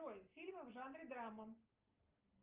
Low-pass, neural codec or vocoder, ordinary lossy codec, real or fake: 3.6 kHz; none; Opus, 24 kbps; real